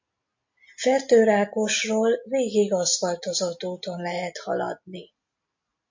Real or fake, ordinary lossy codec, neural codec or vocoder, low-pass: real; MP3, 48 kbps; none; 7.2 kHz